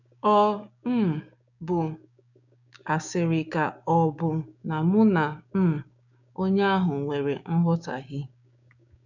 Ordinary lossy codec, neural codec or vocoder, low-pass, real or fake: none; codec, 16 kHz, 6 kbps, DAC; 7.2 kHz; fake